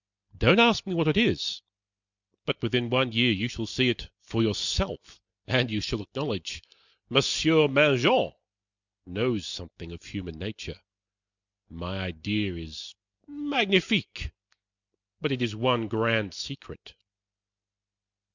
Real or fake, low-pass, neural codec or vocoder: real; 7.2 kHz; none